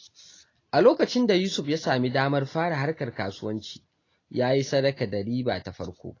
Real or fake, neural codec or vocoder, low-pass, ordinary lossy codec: real; none; 7.2 kHz; AAC, 32 kbps